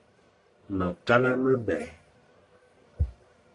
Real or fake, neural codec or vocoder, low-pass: fake; codec, 44.1 kHz, 1.7 kbps, Pupu-Codec; 10.8 kHz